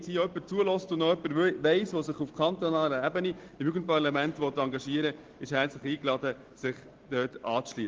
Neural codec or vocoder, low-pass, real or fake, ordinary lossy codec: none; 7.2 kHz; real; Opus, 16 kbps